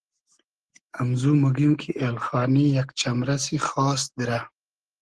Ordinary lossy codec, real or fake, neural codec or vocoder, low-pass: Opus, 16 kbps; real; none; 10.8 kHz